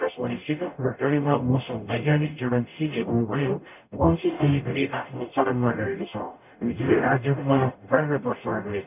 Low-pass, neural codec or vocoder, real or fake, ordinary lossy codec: 3.6 kHz; codec, 44.1 kHz, 0.9 kbps, DAC; fake; none